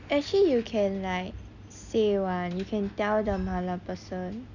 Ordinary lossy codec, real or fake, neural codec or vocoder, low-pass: none; real; none; 7.2 kHz